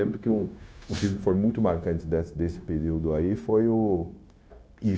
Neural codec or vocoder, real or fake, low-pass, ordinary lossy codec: codec, 16 kHz, 0.9 kbps, LongCat-Audio-Codec; fake; none; none